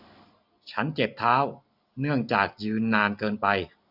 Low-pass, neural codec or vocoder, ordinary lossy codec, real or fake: 5.4 kHz; none; none; real